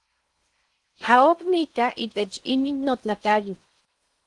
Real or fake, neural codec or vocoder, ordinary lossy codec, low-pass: fake; codec, 16 kHz in and 24 kHz out, 0.6 kbps, FocalCodec, streaming, 4096 codes; Opus, 24 kbps; 10.8 kHz